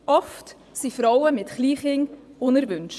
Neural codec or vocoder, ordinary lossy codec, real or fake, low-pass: vocoder, 24 kHz, 100 mel bands, Vocos; none; fake; none